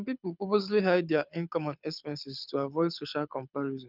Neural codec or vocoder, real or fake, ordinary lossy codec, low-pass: codec, 24 kHz, 6 kbps, HILCodec; fake; none; 5.4 kHz